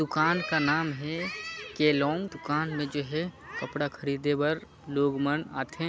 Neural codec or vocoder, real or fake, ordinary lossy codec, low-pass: none; real; none; none